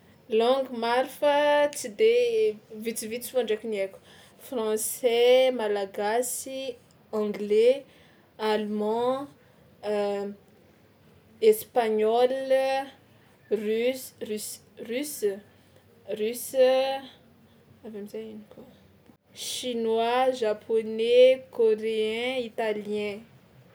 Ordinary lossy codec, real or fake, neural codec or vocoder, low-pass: none; real; none; none